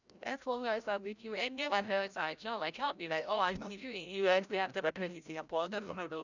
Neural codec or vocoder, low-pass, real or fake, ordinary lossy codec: codec, 16 kHz, 0.5 kbps, FreqCodec, larger model; 7.2 kHz; fake; none